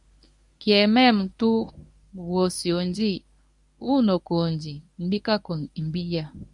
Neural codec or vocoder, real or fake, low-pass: codec, 24 kHz, 0.9 kbps, WavTokenizer, medium speech release version 1; fake; 10.8 kHz